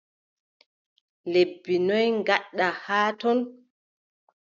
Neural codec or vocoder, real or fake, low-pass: none; real; 7.2 kHz